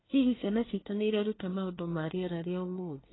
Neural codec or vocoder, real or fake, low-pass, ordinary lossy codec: codec, 24 kHz, 1 kbps, SNAC; fake; 7.2 kHz; AAC, 16 kbps